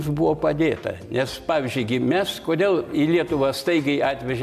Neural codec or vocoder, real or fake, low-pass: none; real; 14.4 kHz